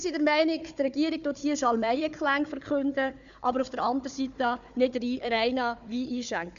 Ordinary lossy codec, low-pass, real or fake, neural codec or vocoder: none; 7.2 kHz; fake; codec, 16 kHz, 4 kbps, FunCodec, trained on Chinese and English, 50 frames a second